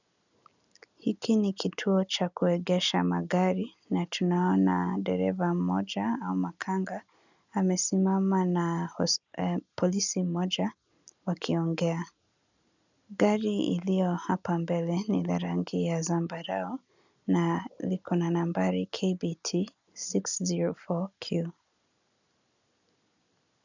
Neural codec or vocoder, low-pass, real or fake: none; 7.2 kHz; real